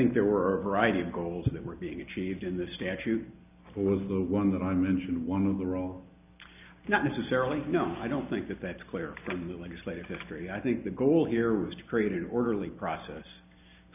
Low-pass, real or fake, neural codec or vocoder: 3.6 kHz; real; none